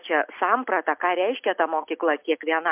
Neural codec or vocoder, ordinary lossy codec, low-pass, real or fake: none; MP3, 32 kbps; 3.6 kHz; real